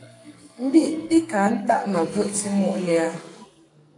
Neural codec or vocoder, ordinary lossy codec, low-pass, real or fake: codec, 44.1 kHz, 2.6 kbps, SNAC; MP3, 64 kbps; 10.8 kHz; fake